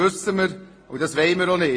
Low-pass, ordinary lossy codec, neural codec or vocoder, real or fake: 9.9 kHz; AAC, 32 kbps; none; real